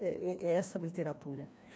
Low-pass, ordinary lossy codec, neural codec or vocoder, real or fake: none; none; codec, 16 kHz, 1 kbps, FreqCodec, larger model; fake